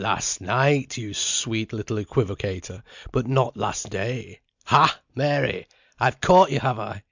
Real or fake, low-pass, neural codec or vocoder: real; 7.2 kHz; none